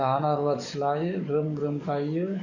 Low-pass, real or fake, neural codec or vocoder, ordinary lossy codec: 7.2 kHz; fake; codec, 44.1 kHz, 7.8 kbps, DAC; none